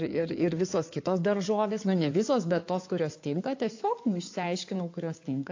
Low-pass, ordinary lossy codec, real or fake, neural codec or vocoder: 7.2 kHz; AAC, 48 kbps; fake; codec, 16 kHz in and 24 kHz out, 2.2 kbps, FireRedTTS-2 codec